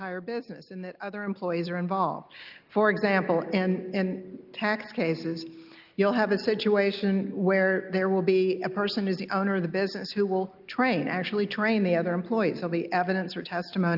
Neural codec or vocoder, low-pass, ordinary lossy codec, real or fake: none; 5.4 kHz; Opus, 24 kbps; real